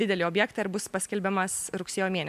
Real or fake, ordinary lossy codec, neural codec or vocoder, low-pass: real; MP3, 96 kbps; none; 14.4 kHz